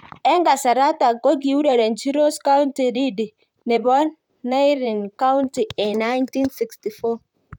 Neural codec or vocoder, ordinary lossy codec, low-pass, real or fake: vocoder, 44.1 kHz, 128 mel bands, Pupu-Vocoder; none; 19.8 kHz; fake